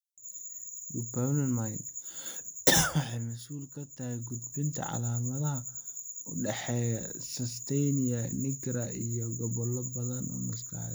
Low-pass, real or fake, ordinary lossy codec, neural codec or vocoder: none; real; none; none